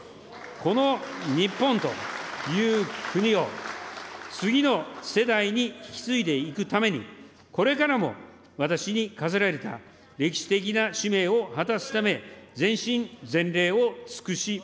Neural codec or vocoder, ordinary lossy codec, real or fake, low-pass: none; none; real; none